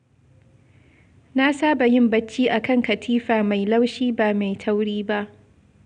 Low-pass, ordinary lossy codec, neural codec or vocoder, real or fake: 9.9 kHz; none; none; real